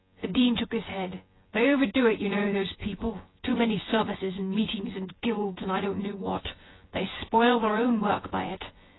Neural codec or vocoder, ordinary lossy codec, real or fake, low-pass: vocoder, 24 kHz, 100 mel bands, Vocos; AAC, 16 kbps; fake; 7.2 kHz